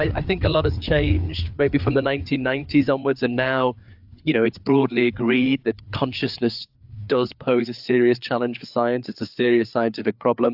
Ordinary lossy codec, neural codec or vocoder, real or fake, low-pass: AAC, 48 kbps; codec, 16 kHz, 4 kbps, FreqCodec, larger model; fake; 5.4 kHz